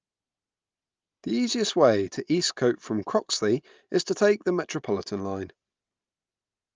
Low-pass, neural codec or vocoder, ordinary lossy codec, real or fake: 7.2 kHz; none; Opus, 24 kbps; real